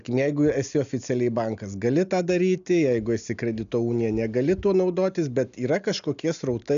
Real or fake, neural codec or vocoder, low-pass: real; none; 7.2 kHz